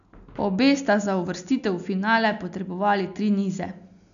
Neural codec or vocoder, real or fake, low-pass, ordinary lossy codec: none; real; 7.2 kHz; none